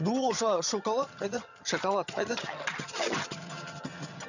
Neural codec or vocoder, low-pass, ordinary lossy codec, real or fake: vocoder, 22.05 kHz, 80 mel bands, HiFi-GAN; 7.2 kHz; none; fake